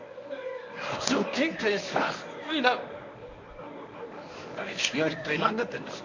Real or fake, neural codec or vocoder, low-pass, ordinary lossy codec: fake; codec, 24 kHz, 0.9 kbps, WavTokenizer, medium speech release version 1; 7.2 kHz; MP3, 64 kbps